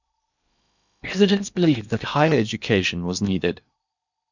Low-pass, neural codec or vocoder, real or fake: 7.2 kHz; codec, 16 kHz in and 24 kHz out, 0.8 kbps, FocalCodec, streaming, 65536 codes; fake